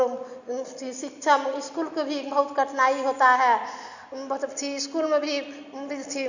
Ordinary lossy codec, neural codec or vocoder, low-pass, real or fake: none; none; 7.2 kHz; real